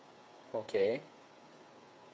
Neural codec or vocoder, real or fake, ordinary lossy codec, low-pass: codec, 16 kHz, 8 kbps, FreqCodec, smaller model; fake; none; none